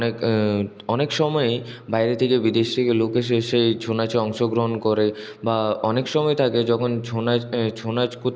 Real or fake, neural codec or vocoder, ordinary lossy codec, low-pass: real; none; none; none